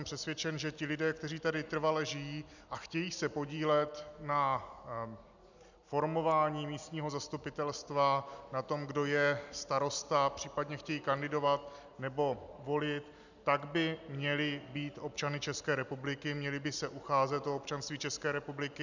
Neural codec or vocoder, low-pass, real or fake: none; 7.2 kHz; real